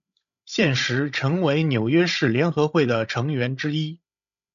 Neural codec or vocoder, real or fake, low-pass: codec, 16 kHz, 16 kbps, FreqCodec, larger model; fake; 7.2 kHz